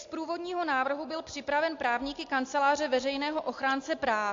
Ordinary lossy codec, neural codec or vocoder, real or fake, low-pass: AAC, 48 kbps; none; real; 7.2 kHz